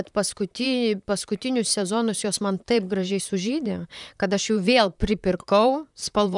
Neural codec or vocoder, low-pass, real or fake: vocoder, 44.1 kHz, 128 mel bands, Pupu-Vocoder; 10.8 kHz; fake